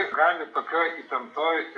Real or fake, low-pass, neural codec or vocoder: real; 10.8 kHz; none